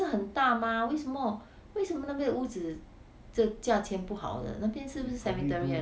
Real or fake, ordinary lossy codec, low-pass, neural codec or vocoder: real; none; none; none